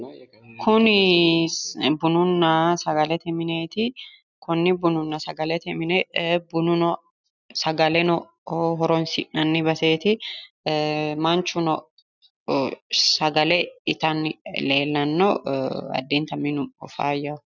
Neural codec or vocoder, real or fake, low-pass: none; real; 7.2 kHz